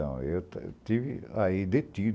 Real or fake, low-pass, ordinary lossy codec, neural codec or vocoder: real; none; none; none